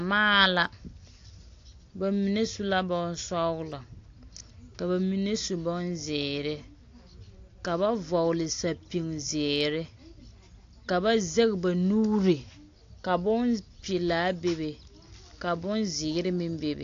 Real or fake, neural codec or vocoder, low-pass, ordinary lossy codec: real; none; 7.2 kHz; AAC, 48 kbps